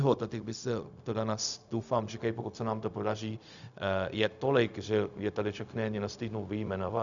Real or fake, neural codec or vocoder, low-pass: fake; codec, 16 kHz, 0.4 kbps, LongCat-Audio-Codec; 7.2 kHz